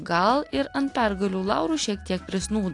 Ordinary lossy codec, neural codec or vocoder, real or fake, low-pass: AAC, 48 kbps; none; real; 10.8 kHz